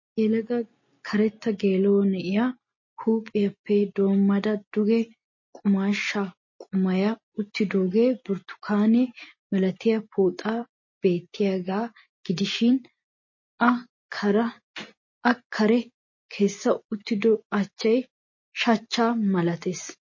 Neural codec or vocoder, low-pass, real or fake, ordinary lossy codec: none; 7.2 kHz; real; MP3, 32 kbps